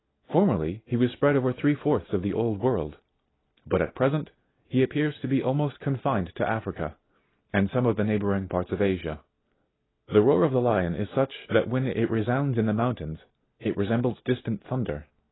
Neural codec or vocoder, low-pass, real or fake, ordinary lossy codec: none; 7.2 kHz; real; AAC, 16 kbps